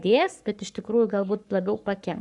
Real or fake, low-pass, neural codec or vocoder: fake; 10.8 kHz; codec, 44.1 kHz, 7.8 kbps, Pupu-Codec